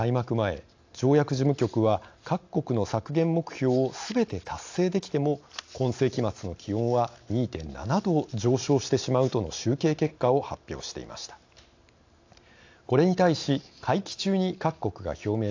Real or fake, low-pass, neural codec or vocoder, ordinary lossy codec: real; 7.2 kHz; none; AAC, 48 kbps